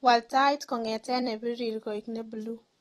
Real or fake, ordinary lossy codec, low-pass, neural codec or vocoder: fake; AAC, 32 kbps; 19.8 kHz; vocoder, 44.1 kHz, 128 mel bands every 256 samples, BigVGAN v2